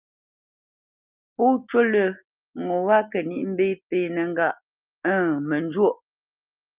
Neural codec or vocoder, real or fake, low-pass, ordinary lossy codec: none; real; 3.6 kHz; Opus, 32 kbps